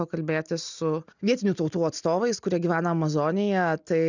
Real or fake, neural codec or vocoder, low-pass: real; none; 7.2 kHz